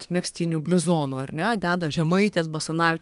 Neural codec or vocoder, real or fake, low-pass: codec, 24 kHz, 1 kbps, SNAC; fake; 10.8 kHz